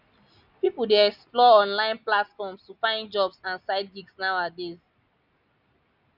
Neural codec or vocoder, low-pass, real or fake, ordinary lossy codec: none; 5.4 kHz; real; none